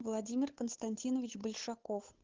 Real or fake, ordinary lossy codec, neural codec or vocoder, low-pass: fake; Opus, 16 kbps; codec, 16 kHz, 8 kbps, FunCodec, trained on Chinese and English, 25 frames a second; 7.2 kHz